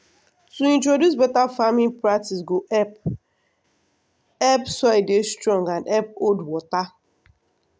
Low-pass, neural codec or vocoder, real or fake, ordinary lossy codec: none; none; real; none